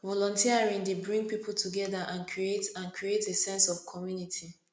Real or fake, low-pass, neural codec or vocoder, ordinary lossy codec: real; none; none; none